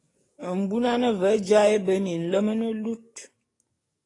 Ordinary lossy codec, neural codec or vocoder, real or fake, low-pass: AAC, 32 kbps; codec, 44.1 kHz, 7.8 kbps, DAC; fake; 10.8 kHz